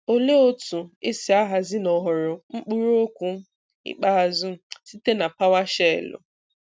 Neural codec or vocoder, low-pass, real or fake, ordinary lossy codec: none; none; real; none